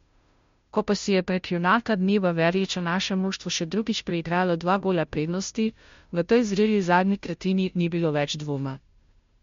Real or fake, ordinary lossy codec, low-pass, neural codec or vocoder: fake; MP3, 48 kbps; 7.2 kHz; codec, 16 kHz, 0.5 kbps, FunCodec, trained on Chinese and English, 25 frames a second